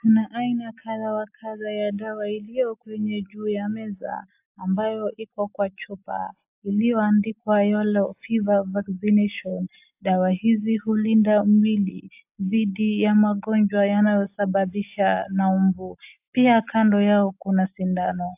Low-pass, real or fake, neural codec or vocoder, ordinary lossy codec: 3.6 kHz; real; none; AAC, 32 kbps